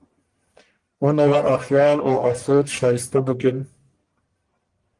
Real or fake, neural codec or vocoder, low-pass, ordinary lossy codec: fake; codec, 44.1 kHz, 1.7 kbps, Pupu-Codec; 10.8 kHz; Opus, 24 kbps